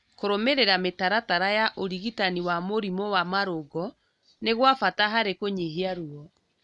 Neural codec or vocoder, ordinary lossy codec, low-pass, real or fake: none; none; 10.8 kHz; real